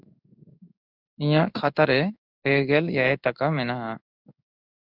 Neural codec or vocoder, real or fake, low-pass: codec, 16 kHz in and 24 kHz out, 1 kbps, XY-Tokenizer; fake; 5.4 kHz